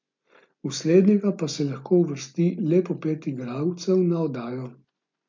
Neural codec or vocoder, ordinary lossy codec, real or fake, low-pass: none; MP3, 48 kbps; real; 7.2 kHz